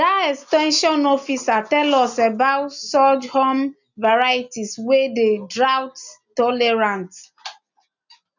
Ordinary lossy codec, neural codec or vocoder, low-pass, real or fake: none; none; 7.2 kHz; real